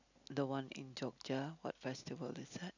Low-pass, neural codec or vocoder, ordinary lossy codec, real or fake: 7.2 kHz; none; none; real